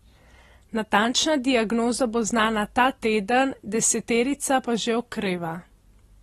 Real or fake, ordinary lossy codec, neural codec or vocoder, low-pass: real; AAC, 32 kbps; none; 19.8 kHz